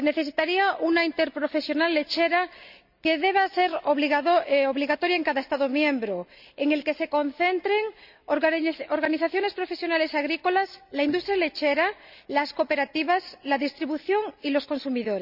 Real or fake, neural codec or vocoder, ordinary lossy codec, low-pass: real; none; none; 5.4 kHz